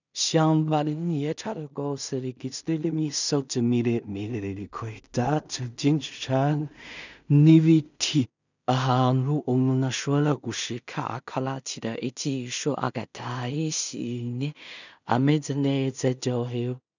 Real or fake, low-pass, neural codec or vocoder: fake; 7.2 kHz; codec, 16 kHz in and 24 kHz out, 0.4 kbps, LongCat-Audio-Codec, two codebook decoder